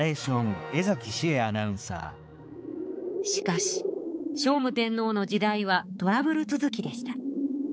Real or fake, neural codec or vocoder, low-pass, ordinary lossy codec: fake; codec, 16 kHz, 4 kbps, X-Codec, HuBERT features, trained on balanced general audio; none; none